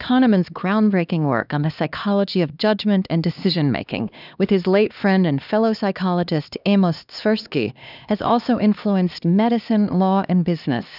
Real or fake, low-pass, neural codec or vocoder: fake; 5.4 kHz; codec, 16 kHz, 2 kbps, X-Codec, HuBERT features, trained on LibriSpeech